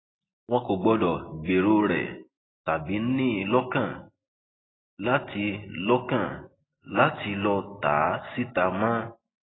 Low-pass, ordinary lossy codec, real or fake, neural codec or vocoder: 7.2 kHz; AAC, 16 kbps; real; none